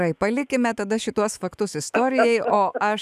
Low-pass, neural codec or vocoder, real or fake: 14.4 kHz; autoencoder, 48 kHz, 128 numbers a frame, DAC-VAE, trained on Japanese speech; fake